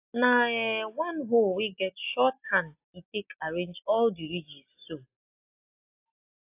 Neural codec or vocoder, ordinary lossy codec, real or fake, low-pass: none; AAC, 32 kbps; real; 3.6 kHz